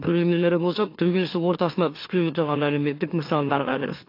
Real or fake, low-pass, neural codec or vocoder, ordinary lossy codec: fake; 5.4 kHz; autoencoder, 44.1 kHz, a latent of 192 numbers a frame, MeloTTS; AAC, 32 kbps